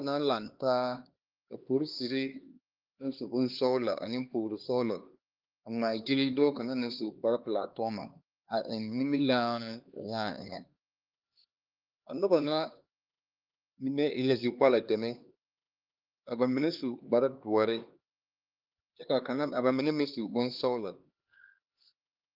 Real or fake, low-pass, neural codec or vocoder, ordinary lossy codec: fake; 5.4 kHz; codec, 16 kHz, 2 kbps, X-Codec, HuBERT features, trained on LibriSpeech; Opus, 32 kbps